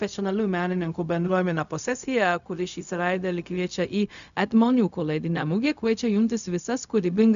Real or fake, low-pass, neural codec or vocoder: fake; 7.2 kHz; codec, 16 kHz, 0.4 kbps, LongCat-Audio-Codec